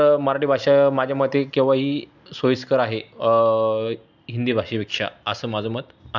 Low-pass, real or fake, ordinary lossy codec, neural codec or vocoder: 7.2 kHz; real; none; none